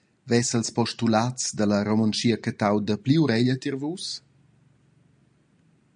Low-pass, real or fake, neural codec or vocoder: 9.9 kHz; real; none